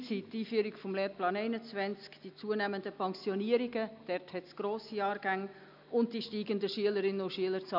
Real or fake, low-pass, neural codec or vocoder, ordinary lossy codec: real; 5.4 kHz; none; none